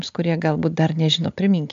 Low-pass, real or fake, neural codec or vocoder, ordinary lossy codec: 7.2 kHz; real; none; AAC, 64 kbps